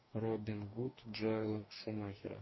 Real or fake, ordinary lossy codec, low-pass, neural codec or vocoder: fake; MP3, 24 kbps; 7.2 kHz; codec, 44.1 kHz, 2.6 kbps, DAC